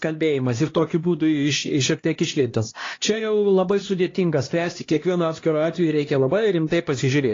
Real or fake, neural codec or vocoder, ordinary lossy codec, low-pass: fake; codec, 16 kHz, 1 kbps, X-Codec, HuBERT features, trained on LibriSpeech; AAC, 32 kbps; 7.2 kHz